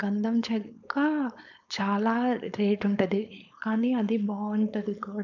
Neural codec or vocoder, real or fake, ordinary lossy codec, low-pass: codec, 16 kHz, 4.8 kbps, FACodec; fake; none; 7.2 kHz